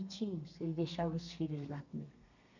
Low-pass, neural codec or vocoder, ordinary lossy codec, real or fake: 7.2 kHz; codec, 32 kHz, 1.9 kbps, SNAC; none; fake